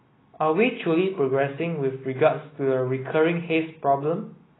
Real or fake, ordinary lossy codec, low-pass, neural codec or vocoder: real; AAC, 16 kbps; 7.2 kHz; none